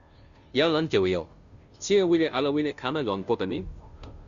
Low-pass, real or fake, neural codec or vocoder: 7.2 kHz; fake; codec, 16 kHz, 0.5 kbps, FunCodec, trained on Chinese and English, 25 frames a second